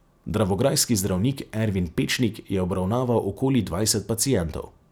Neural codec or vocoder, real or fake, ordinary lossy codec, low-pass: none; real; none; none